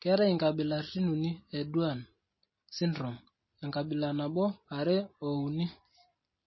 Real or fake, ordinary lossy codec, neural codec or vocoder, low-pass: real; MP3, 24 kbps; none; 7.2 kHz